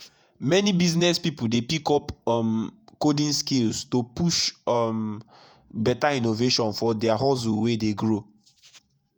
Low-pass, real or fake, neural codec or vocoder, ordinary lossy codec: none; fake; vocoder, 48 kHz, 128 mel bands, Vocos; none